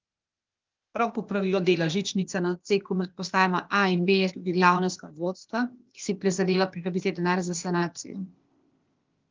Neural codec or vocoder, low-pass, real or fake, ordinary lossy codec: codec, 16 kHz, 0.8 kbps, ZipCodec; 7.2 kHz; fake; Opus, 32 kbps